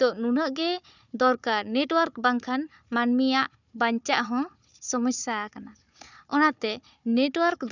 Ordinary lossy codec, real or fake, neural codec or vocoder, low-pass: none; fake; codec, 16 kHz, 4 kbps, FunCodec, trained on Chinese and English, 50 frames a second; 7.2 kHz